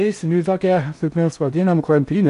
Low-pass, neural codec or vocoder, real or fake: 10.8 kHz; codec, 16 kHz in and 24 kHz out, 0.6 kbps, FocalCodec, streaming, 4096 codes; fake